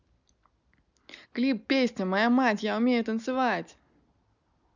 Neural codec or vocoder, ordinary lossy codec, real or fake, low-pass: none; none; real; 7.2 kHz